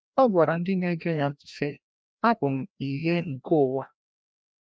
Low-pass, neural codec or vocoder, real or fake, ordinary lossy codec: none; codec, 16 kHz, 1 kbps, FreqCodec, larger model; fake; none